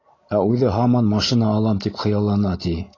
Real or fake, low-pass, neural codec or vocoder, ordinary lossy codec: real; 7.2 kHz; none; AAC, 32 kbps